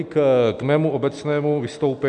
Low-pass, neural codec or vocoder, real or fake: 9.9 kHz; none; real